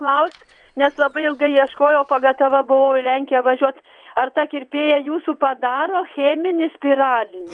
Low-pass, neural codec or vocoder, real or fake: 9.9 kHz; vocoder, 22.05 kHz, 80 mel bands, WaveNeXt; fake